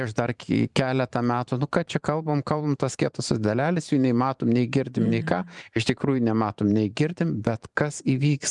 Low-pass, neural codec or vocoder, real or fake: 10.8 kHz; vocoder, 44.1 kHz, 128 mel bands every 512 samples, BigVGAN v2; fake